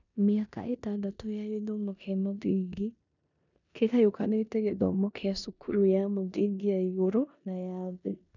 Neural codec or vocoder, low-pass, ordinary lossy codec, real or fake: codec, 16 kHz in and 24 kHz out, 0.9 kbps, LongCat-Audio-Codec, four codebook decoder; 7.2 kHz; none; fake